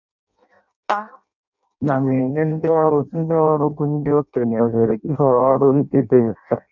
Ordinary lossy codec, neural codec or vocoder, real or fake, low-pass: none; codec, 16 kHz in and 24 kHz out, 0.6 kbps, FireRedTTS-2 codec; fake; 7.2 kHz